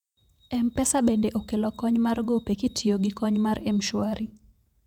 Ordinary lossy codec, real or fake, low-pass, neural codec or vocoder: none; fake; 19.8 kHz; vocoder, 48 kHz, 128 mel bands, Vocos